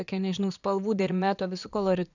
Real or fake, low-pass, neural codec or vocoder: fake; 7.2 kHz; vocoder, 22.05 kHz, 80 mel bands, Vocos